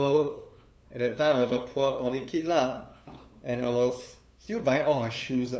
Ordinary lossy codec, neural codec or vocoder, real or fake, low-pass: none; codec, 16 kHz, 2 kbps, FunCodec, trained on LibriTTS, 25 frames a second; fake; none